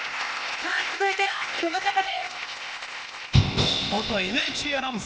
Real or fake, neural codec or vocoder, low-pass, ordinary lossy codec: fake; codec, 16 kHz, 0.8 kbps, ZipCodec; none; none